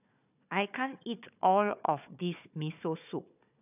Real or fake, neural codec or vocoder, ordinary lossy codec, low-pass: fake; codec, 16 kHz, 4 kbps, FunCodec, trained on Chinese and English, 50 frames a second; none; 3.6 kHz